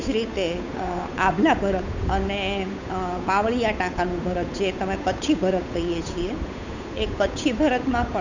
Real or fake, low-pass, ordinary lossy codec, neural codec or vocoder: fake; 7.2 kHz; none; codec, 16 kHz, 8 kbps, FunCodec, trained on Chinese and English, 25 frames a second